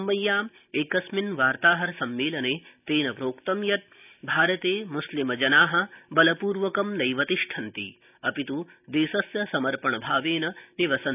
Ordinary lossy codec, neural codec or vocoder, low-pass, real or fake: none; none; 3.6 kHz; real